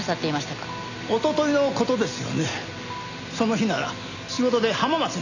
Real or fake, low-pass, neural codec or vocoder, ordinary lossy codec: real; 7.2 kHz; none; none